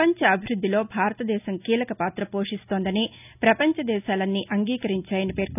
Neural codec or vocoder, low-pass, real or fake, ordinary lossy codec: none; 3.6 kHz; real; none